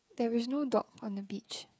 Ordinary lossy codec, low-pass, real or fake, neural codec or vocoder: none; none; fake; codec, 16 kHz, 8 kbps, FreqCodec, smaller model